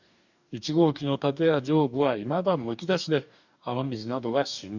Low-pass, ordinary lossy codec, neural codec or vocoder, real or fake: 7.2 kHz; none; codec, 44.1 kHz, 2.6 kbps, DAC; fake